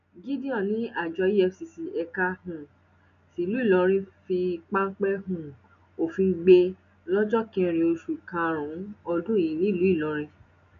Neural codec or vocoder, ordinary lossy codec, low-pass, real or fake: none; none; 7.2 kHz; real